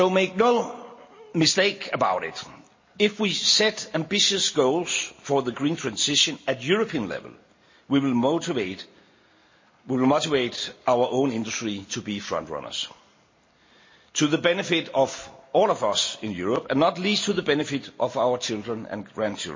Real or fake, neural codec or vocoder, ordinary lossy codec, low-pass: real; none; MP3, 32 kbps; 7.2 kHz